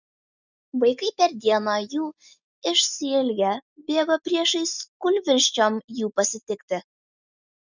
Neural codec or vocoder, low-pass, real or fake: none; 7.2 kHz; real